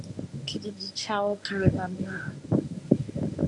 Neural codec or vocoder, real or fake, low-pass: codec, 24 kHz, 0.9 kbps, WavTokenizer, medium speech release version 1; fake; 10.8 kHz